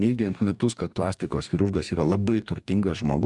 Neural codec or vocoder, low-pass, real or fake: codec, 44.1 kHz, 2.6 kbps, DAC; 10.8 kHz; fake